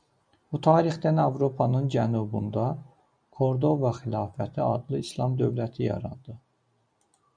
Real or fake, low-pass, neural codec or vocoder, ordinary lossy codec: real; 9.9 kHz; none; MP3, 96 kbps